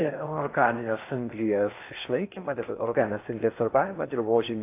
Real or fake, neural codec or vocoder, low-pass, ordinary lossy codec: fake; codec, 16 kHz in and 24 kHz out, 0.6 kbps, FocalCodec, streaming, 4096 codes; 3.6 kHz; AAC, 24 kbps